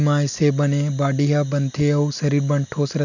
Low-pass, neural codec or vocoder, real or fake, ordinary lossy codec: 7.2 kHz; none; real; none